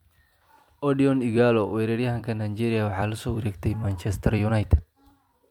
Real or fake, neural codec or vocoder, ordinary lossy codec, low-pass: fake; vocoder, 44.1 kHz, 128 mel bands every 256 samples, BigVGAN v2; MP3, 96 kbps; 19.8 kHz